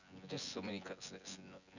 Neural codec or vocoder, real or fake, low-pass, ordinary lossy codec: vocoder, 24 kHz, 100 mel bands, Vocos; fake; 7.2 kHz; none